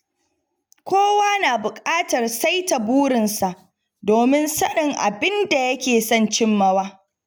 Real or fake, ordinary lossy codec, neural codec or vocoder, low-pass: real; none; none; none